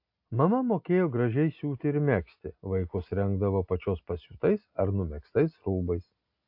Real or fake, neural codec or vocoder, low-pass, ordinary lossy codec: real; none; 5.4 kHz; AAC, 48 kbps